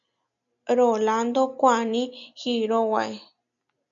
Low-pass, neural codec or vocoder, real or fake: 7.2 kHz; none; real